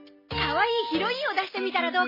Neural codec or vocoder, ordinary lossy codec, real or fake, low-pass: none; MP3, 24 kbps; real; 5.4 kHz